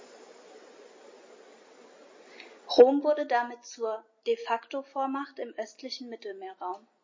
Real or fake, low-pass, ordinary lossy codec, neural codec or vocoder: real; 7.2 kHz; MP3, 32 kbps; none